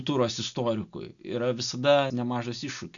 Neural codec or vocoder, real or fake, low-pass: none; real; 7.2 kHz